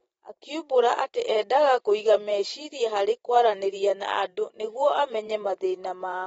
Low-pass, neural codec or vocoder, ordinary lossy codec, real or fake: 19.8 kHz; vocoder, 44.1 kHz, 128 mel bands every 512 samples, BigVGAN v2; AAC, 24 kbps; fake